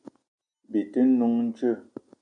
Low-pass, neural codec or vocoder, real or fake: 9.9 kHz; none; real